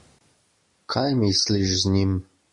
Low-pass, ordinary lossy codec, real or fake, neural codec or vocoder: 10.8 kHz; MP3, 48 kbps; real; none